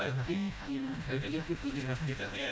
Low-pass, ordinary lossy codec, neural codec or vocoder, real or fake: none; none; codec, 16 kHz, 0.5 kbps, FreqCodec, smaller model; fake